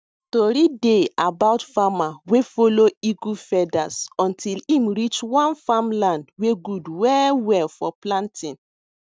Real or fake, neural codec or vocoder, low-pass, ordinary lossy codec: real; none; none; none